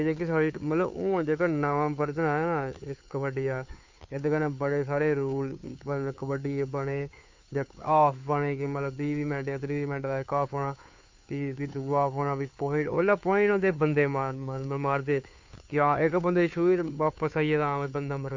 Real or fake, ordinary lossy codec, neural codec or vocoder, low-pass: fake; MP3, 48 kbps; codec, 16 kHz, 2 kbps, FunCodec, trained on Chinese and English, 25 frames a second; 7.2 kHz